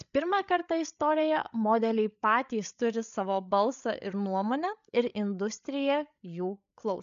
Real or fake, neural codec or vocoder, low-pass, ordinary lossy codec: fake; codec, 16 kHz, 8 kbps, FreqCodec, larger model; 7.2 kHz; MP3, 96 kbps